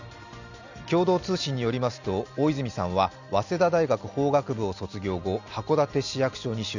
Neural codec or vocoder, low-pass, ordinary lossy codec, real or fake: none; 7.2 kHz; none; real